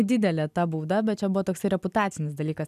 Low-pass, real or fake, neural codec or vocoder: 14.4 kHz; real; none